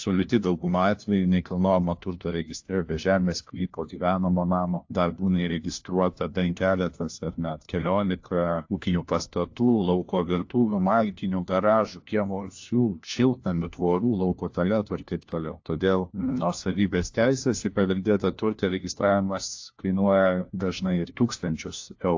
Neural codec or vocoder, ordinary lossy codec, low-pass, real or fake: codec, 16 kHz, 1 kbps, FunCodec, trained on LibriTTS, 50 frames a second; AAC, 48 kbps; 7.2 kHz; fake